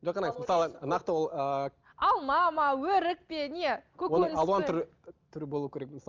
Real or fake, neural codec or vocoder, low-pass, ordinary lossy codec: real; none; 7.2 kHz; Opus, 24 kbps